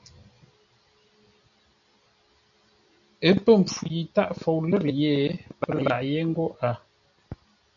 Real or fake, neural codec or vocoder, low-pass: real; none; 7.2 kHz